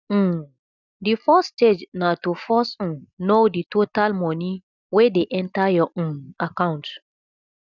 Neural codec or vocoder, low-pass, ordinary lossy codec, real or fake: none; 7.2 kHz; none; real